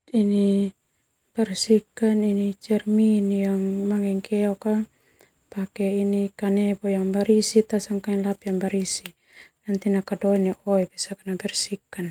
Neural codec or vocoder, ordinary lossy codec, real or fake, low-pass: none; Opus, 32 kbps; real; 19.8 kHz